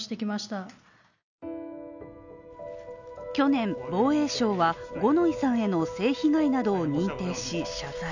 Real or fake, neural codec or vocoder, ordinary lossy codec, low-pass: real; none; none; 7.2 kHz